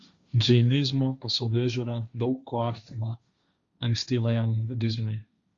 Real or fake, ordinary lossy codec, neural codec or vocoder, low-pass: fake; Opus, 64 kbps; codec, 16 kHz, 1.1 kbps, Voila-Tokenizer; 7.2 kHz